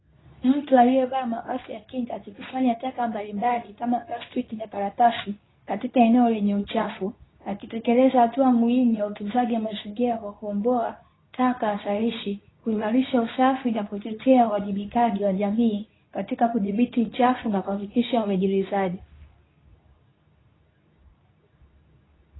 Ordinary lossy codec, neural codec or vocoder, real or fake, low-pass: AAC, 16 kbps; codec, 24 kHz, 0.9 kbps, WavTokenizer, medium speech release version 2; fake; 7.2 kHz